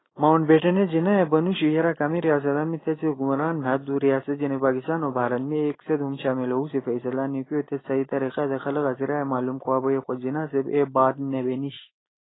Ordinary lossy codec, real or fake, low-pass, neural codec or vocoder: AAC, 16 kbps; real; 7.2 kHz; none